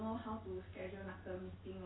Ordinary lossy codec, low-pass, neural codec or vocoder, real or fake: AAC, 16 kbps; 7.2 kHz; none; real